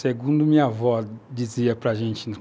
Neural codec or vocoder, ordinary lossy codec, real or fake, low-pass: none; none; real; none